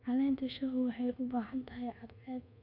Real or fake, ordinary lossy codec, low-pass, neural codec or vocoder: fake; none; 5.4 kHz; codec, 24 kHz, 1.2 kbps, DualCodec